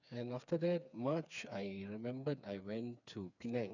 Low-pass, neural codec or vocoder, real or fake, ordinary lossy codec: 7.2 kHz; codec, 16 kHz, 4 kbps, FreqCodec, smaller model; fake; none